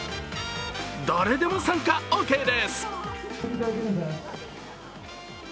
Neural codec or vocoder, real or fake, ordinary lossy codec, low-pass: none; real; none; none